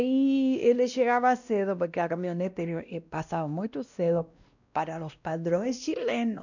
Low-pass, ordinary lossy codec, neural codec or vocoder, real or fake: 7.2 kHz; none; codec, 16 kHz, 1 kbps, X-Codec, WavLM features, trained on Multilingual LibriSpeech; fake